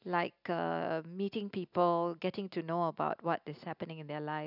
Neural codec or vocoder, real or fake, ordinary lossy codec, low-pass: none; real; none; 5.4 kHz